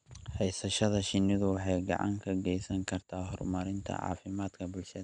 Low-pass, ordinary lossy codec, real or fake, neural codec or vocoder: 9.9 kHz; none; real; none